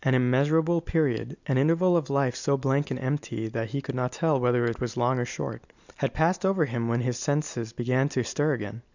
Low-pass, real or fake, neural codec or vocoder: 7.2 kHz; real; none